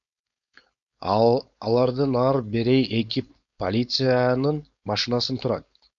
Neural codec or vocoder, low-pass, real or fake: codec, 16 kHz, 4.8 kbps, FACodec; 7.2 kHz; fake